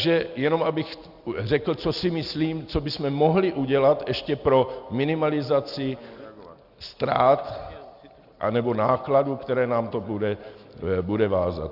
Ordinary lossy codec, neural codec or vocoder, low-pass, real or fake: Opus, 64 kbps; none; 5.4 kHz; real